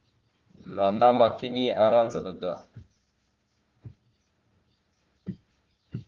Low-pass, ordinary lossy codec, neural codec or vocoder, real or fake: 7.2 kHz; Opus, 32 kbps; codec, 16 kHz, 1 kbps, FunCodec, trained on Chinese and English, 50 frames a second; fake